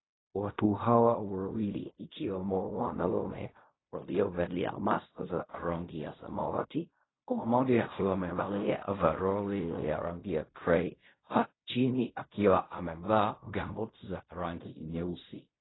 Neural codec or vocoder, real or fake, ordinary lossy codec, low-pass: codec, 16 kHz in and 24 kHz out, 0.4 kbps, LongCat-Audio-Codec, fine tuned four codebook decoder; fake; AAC, 16 kbps; 7.2 kHz